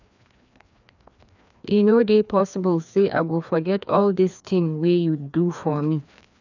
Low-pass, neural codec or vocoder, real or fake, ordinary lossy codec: 7.2 kHz; codec, 16 kHz, 2 kbps, FreqCodec, larger model; fake; none